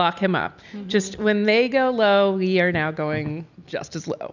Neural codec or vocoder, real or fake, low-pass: none; real; 7.2 kHz